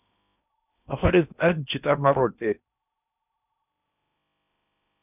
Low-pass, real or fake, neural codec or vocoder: 3.6 kHz; fake; codec, 16 kHz in and 24 kHz out, 0.8 kbps, FocalCodec, streaming, 65536 codes